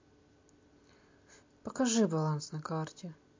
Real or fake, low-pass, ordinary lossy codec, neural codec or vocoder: real; 7.2 kHz; MP3, 48 kbps; none